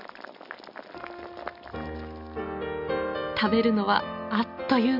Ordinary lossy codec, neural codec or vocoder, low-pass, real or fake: AAC, 48 kbps; none; 5.4 kHz; real